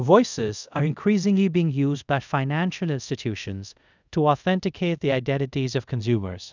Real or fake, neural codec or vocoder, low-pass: fake; codec, 24 kHz, 0.5 kbps, DualCodec; 7.2 kHz